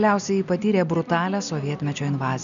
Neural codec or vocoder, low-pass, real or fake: none; 7.2 kHz; real